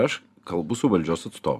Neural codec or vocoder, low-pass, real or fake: vocoder, 44.1 kHz, 128 mel bands every 256 samples, BigVGAN v2; 14.4 kHz; fake